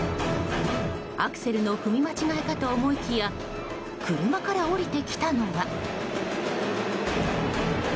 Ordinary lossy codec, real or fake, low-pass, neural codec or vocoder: none; real; none; none